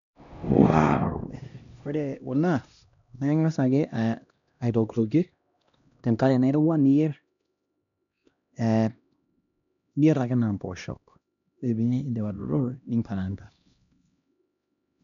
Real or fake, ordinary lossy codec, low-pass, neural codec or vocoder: fake; none; 7.2 kHz; codec, 16 kHz, 1 kbps, X-Codec, HuBERT features, trained on LibriSpeech